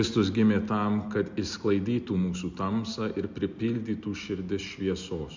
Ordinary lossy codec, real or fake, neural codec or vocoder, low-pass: MP3, 64 kbps; real; none; 7.2 kHz